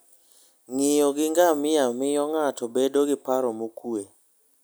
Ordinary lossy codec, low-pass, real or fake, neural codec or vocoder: none; none; real; none